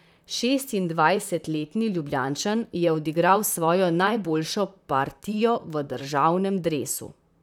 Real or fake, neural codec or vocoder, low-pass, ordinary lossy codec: fake; vocoder, 44.1 kHz, 128 mel bands, Pupu-Vocoder; 19.8 kHz; none